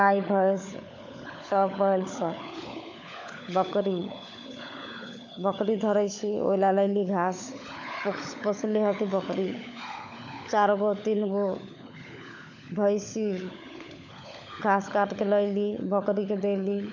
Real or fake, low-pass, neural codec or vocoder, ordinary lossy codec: fake; 7.2 kHz; codec, 16 kHz, 16 kbps, FunCodec, trained on LibriTTS, 50 frames a second; none